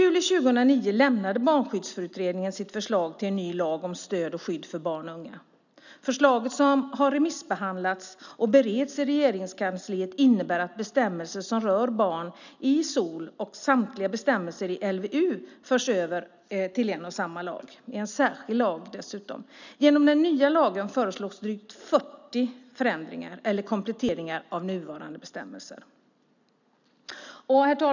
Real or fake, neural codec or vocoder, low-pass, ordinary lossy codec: real; none; 7.2 kHz; none